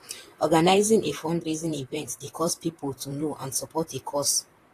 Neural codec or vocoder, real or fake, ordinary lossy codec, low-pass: vocoder, 44.1 kHz, 128 mel bands, Pupu-Vocoder; fake; AAC, 48 kbps; 14.4 kHz